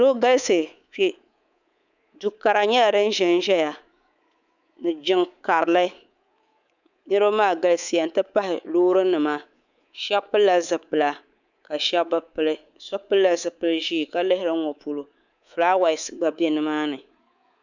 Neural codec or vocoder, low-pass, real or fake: codec, 24 kHz, 3.1 kbps, DualCodec; 7.2 kHz; fake